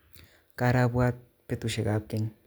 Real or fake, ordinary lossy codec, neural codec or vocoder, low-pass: real; none; none; none